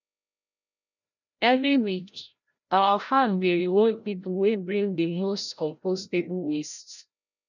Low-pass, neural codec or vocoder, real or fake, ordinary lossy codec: 7.2 kHz; codec, 16 kHz, 0.5 kbps, FreqCodec, larger model; fake; none